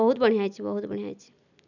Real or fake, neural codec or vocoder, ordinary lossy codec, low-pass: real; none; none; 7.2 kHz